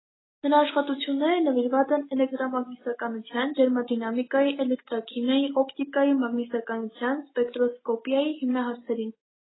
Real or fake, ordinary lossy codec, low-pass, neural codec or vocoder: real; AAC, 16 kbps; 7.2 kHz; none